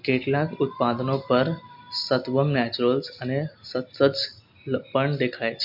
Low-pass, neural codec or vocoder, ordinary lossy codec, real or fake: 5.4 kHz; none; MP3, 48 kbps; real